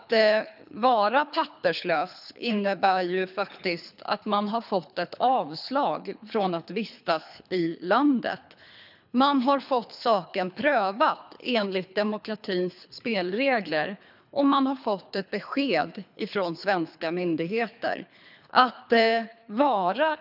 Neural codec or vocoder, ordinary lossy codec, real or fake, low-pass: codec, 24 kHz, 3 kbps, HILCodec; none; fake; 5.4 kHz